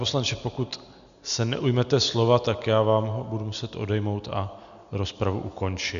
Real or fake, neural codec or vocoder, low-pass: real; none; 7.2 kHz